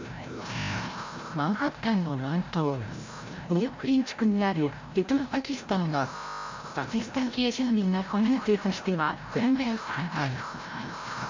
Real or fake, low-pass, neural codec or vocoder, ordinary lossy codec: fake; 7.2 kHz; codec, 16 kHz, 0.5 kbps, FreqCodec, larger model; MP3, 48 kbps